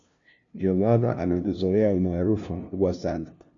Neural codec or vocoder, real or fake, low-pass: codec, 16 kHz, 0.5 kbps, FunCodec, trained on LibriTTS, 25 frames a second; fake; 7.2 kHz